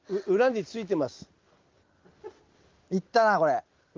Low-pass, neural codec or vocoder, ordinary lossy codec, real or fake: 7.2 kHz; none; Opus, 24 kbps; real